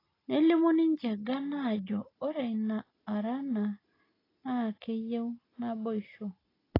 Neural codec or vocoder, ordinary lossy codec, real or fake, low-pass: none; AAC, 24 kbps; real; 5.4 kHz